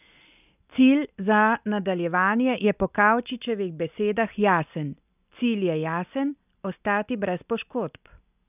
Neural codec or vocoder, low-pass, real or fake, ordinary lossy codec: none; 3.6 kHz; real; none